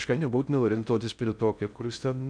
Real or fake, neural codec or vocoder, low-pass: fake; codec, 16 kHz in and 24 kHz out, 0.6 kbps, FocalCodec, streaming, 4096 codes; 9.9 kHz